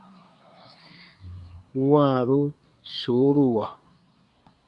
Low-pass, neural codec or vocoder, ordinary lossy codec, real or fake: 10.8 kHz; codec, 24 kHz, 1 kbps, SNAC; Opus, 64 kbps; fake